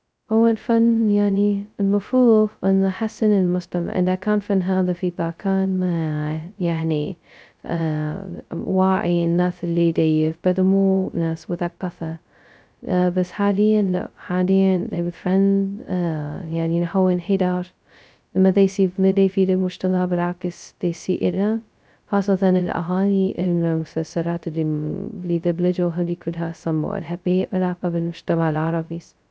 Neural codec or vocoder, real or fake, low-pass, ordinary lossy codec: codec, 16 kHz, 0.2 kbps, FocalCodec; fake; none; none